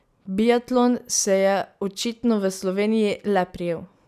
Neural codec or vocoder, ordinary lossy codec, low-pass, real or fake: none; none; 14.4 kHz; real